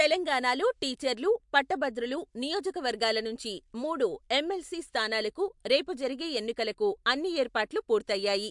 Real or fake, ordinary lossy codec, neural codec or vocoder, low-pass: real; MP3, 64 kbps; none; 10.8 kHz